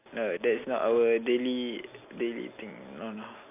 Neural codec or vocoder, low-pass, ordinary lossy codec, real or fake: none; 3.6 kHz; AAC, 32 kbps; real